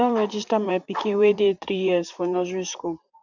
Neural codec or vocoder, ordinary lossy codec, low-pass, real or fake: vocoder, 44.1 kHz, 128 mel bands, Pupu-Vocoder; none; 7.2 kHz; fake